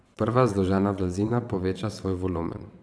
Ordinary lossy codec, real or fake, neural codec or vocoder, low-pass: none; fake; vocoder, 22.05 kHz, 80 mel bands, WaveNeXt; none